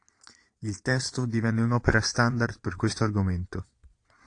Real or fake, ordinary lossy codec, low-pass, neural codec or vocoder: fake; AAC, 48 kbps; 9.9 kHz; vocoder, 22.05 kHz, 80 mel bands, Vocos